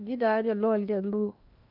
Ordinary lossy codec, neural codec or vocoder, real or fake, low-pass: none; codec, 16 kHz in and 24 kHz out, 0.8 kbps, FocalCodec, streaming, 65536 codes; fake; 5.4 kHz